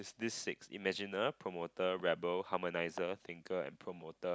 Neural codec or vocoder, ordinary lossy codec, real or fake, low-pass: none; none; real; none